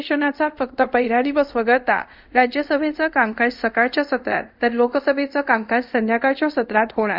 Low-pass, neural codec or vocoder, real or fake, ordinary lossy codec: 5.4 kHz; codec, 24 kHz, 0.5 kbps, DualCodec; fake; none